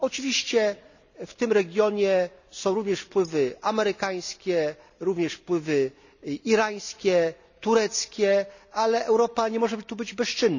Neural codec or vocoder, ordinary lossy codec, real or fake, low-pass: none; none; real; 7.2 kHz